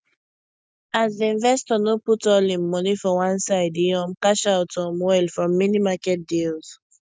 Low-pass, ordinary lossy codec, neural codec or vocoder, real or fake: none; none; none; real